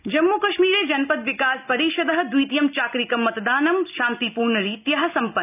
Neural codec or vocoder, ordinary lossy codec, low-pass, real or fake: none; none; 3.6 kHz; real